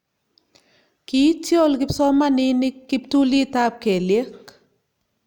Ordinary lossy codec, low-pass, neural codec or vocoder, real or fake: none; 19.8 kHz; none; real